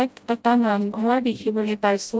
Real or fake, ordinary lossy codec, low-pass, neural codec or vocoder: fake; none; none; codec, 16 kHz, 0.5 kbps, FreqCodec, smaller model